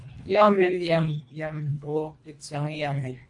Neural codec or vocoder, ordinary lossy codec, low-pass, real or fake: codec, 24 kHz, 1.5 kbps, HILCodec; MP3, 48 kbps; 10.8 kHz; fake